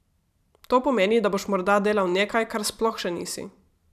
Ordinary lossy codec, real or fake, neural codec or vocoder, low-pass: none; fake; vocoder, 44.1 kHz, 128 mel bands every 512 samples, BigVGAN v2; 14.4 kHz